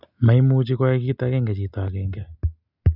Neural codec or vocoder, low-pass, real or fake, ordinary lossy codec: none; 5.4 kHz; real; none